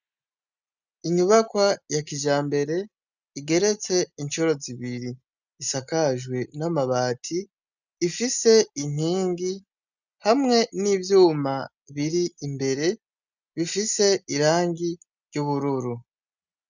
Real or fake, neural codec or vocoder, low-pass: real; none; 7.2 kHz